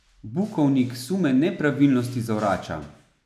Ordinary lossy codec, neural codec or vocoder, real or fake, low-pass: none; none; real; 14.4 kHz